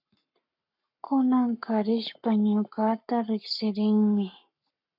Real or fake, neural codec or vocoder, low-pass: fake; codec, 44.1 kHz, 7.8 kbps, Pupu-Codec; 5.4 kHz